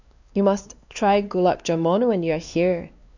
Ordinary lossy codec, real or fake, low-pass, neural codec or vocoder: none; fake; 7.2 kHz; codec, 16 kHz, 2 kbps, X-Codec, WavLM features, trained on Multilingual LibriSpeech